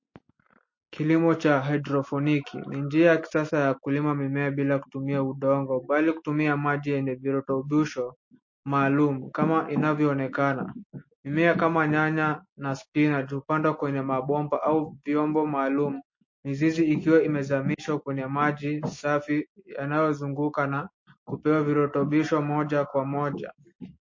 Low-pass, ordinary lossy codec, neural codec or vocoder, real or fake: 7.2 kHz; MP3, 32 kbps; none; real